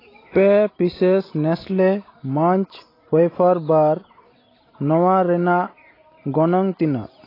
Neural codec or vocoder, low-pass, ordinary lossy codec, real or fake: none; 5.4 kHz; AAC, 24 kbps; real